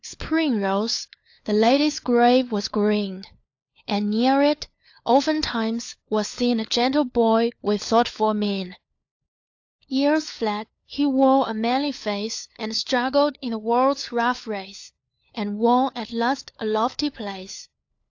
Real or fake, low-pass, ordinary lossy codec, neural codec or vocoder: fake; 7.2 kHz; AAC, 48 kbps; codec, 16 kHz, 4 kbps, FunCodec, trained on LibriTTS, 50 frames a second